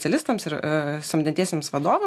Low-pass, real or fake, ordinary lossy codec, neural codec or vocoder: 14.4 kHz; real; AAC, 64 kbps; none